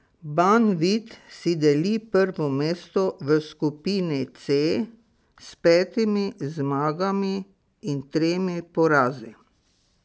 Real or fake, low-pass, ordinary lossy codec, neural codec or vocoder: real; none; none; none